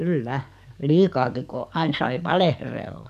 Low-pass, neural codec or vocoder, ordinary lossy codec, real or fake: 14.4 kHz; codec, 44.1 kHz, 7.8 kbps, DAC; none; fake